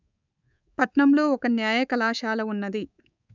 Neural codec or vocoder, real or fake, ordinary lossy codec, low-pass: codec, 24 kHz, 3.1 kbps, DualCodec; fake; none; 7.2 kHz